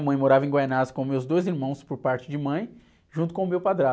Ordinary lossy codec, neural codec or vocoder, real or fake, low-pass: none; none; real; none